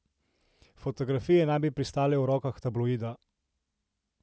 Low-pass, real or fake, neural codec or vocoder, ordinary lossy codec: none; real; none; none